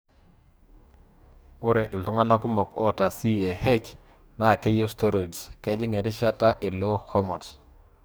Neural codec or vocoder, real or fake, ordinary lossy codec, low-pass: codec, 44.1 kHz, 2.6 kbps, DAC; fake; none; none